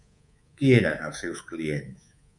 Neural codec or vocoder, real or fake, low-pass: codec, 24 kHz, 3.1 kbps, DualCodec; fake; 10.8 kHz